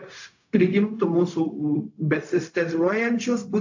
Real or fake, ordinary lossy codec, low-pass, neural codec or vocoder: fake; AAC, 48 kbps; 7.2 kHz; codec, 16 kHz, 0.4 kbps, LongCat-Audio-Codec